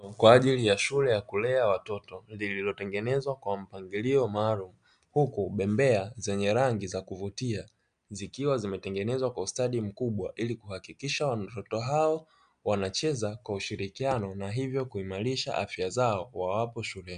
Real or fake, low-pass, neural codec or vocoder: real; 9.9 kHz; none